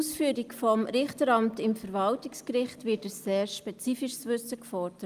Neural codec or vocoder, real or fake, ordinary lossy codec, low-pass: none; real; Opus, 32 kbps; 14.4 kHz